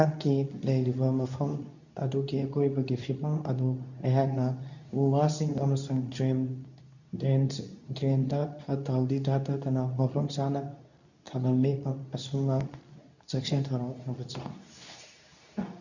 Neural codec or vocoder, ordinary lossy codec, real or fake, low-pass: codec, 24 kHz, 0.9 kbps, WavTokenizer, medium speech release version 2; none; fake; 7.2 kHz